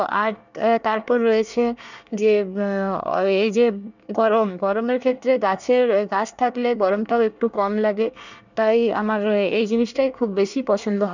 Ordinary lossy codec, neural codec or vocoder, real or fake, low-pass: none; codec, 24 kHz, 1 kbps, SNAC; fake; 7.2 kHz